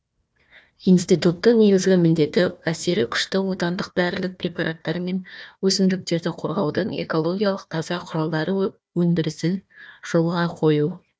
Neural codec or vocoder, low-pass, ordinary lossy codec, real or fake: codec, 16 kHz, 1 kbps, FunCodec, trained on Chinese and English, 50 frames a second; none; none; fake